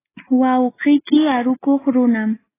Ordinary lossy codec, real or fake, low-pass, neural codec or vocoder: AAC, 16 kbps; real; 3.6 kHz; none